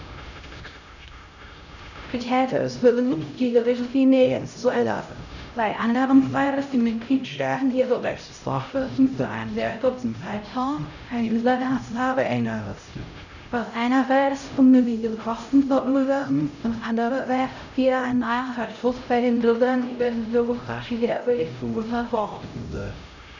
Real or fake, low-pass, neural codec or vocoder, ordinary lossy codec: fake; 7.2 kHz; codec, 16 kHz, 0.5 kbps, X-Codec, HuBERT features, trained on LibriSpeech; none